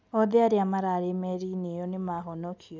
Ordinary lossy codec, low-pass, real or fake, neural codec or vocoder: none; none; real; none